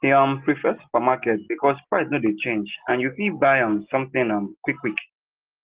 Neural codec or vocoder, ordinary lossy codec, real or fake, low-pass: none; Opus, 16 kbps; real; 3.6 kHz